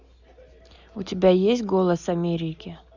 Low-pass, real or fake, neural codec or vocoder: 7.2 kHz; real; none